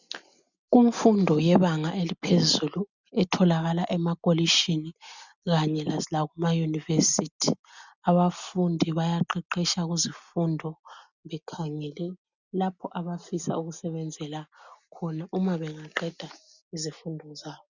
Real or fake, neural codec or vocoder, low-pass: real; none; 7.2 kHz